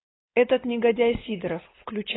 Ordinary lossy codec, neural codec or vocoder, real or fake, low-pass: AAC, 16 kbps; codec, 16 kHz, 4.8 kbps, FACodec; fake; 7.2 kHz